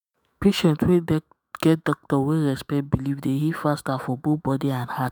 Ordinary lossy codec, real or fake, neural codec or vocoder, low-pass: none; fake; autoencoder, 48 kHz, 128 numbers a frame, DAC-VAE, trained on Japanese speech; none